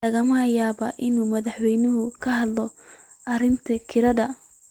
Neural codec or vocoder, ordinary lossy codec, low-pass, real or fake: none; Opus, 24 kbps; 19.8 kHz; real